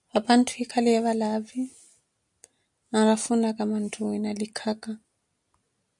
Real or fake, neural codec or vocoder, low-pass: real; none; 10.8 kHz